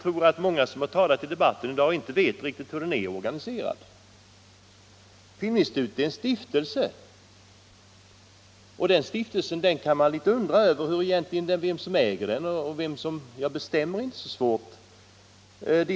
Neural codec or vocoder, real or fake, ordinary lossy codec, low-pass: none; real; none; none